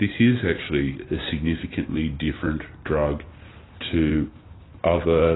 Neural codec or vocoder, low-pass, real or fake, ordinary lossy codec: none; 7.2 kHz; real; AAC, 16 kbps